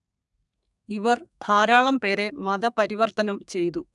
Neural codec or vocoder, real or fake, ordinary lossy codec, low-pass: codec, 32 kHz, 1.9 kbps, SNAC; fake; none; 10.8 kHz